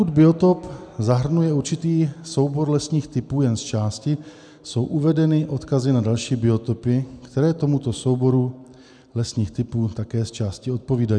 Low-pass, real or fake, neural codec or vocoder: 9.9 kHz; real; none